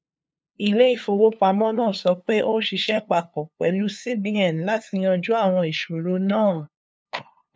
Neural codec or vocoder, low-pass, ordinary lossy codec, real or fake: codec, 16 kHz, 2 kbps, FunCodec, trained on LibriTTS, 25 frames a second; none; none; fake